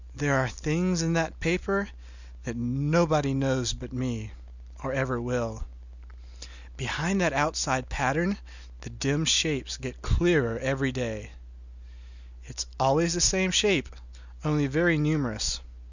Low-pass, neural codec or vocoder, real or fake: 7.2 kHz; none; real